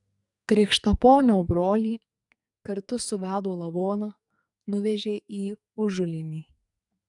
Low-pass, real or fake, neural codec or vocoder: 10.8 kHz; fake; codec, 44.1 kHz, 2.6 kbps, SNAC